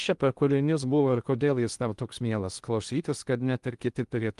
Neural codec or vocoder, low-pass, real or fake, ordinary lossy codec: codec, 16 kHz in and 24 kHz out, 0.6 kbps, FocalCodec, streaming, 2048 codes; 10.8 kHz; fake; Opus, 32 kbps